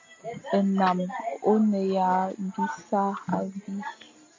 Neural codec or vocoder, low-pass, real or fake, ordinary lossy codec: none; 7.2 kHz; real; MP3, 48 kbps